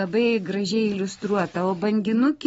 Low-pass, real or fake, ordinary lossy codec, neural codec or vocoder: 19.8 kHz; real; AAC, 24 kbps; none